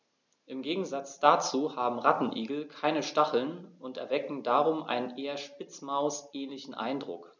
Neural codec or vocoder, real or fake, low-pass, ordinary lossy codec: none; real; 7.2 kHz; none